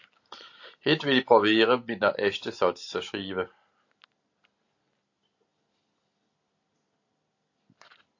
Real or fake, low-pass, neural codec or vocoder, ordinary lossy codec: real; 7.2 kHz; none; AAC, 48 kbps